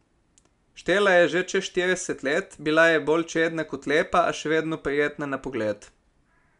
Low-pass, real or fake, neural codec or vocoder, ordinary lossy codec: 10.8 kHz; real; none; none